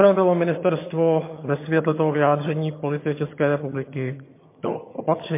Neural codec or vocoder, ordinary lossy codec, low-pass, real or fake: vocoder, 22.05 kHz, 80 mel bands, HiFi-GAN; MP3, 24 kbps; 3.6 kHz; fake